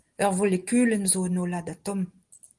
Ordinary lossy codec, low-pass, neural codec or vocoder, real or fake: Opus, 24 kbps; 10.8 kHz; none; real